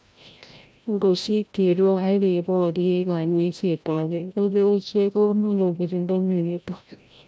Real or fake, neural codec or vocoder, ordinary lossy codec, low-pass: fake; codec, 16 kHz, 0.5 kbps, FreqCodec, larger model; none; none